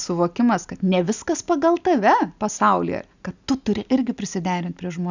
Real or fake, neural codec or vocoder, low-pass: real; none; 7.2 kHz